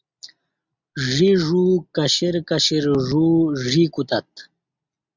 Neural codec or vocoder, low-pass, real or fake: none; 7.2 kHz; real